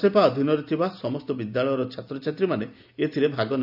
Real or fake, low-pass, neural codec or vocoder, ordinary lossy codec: real; 5.4 kHz; none; none